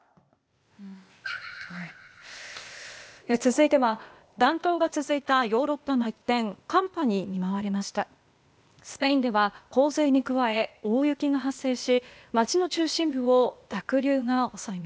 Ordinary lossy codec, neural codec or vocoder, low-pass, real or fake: none; codec, 16 kHz, 0.8 kbps, ZipCodec; none; fake